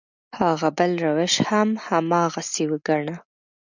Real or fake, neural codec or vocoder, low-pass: real; none; 7.2 kHz